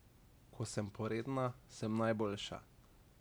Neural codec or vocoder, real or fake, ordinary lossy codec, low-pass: none; real; none; none